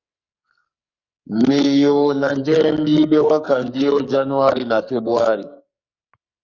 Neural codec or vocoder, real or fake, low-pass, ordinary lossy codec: codec, 44.1 kHz, 2.6 kbps, SNAC; fake; 7.2 kHz; Opus, 64 kbps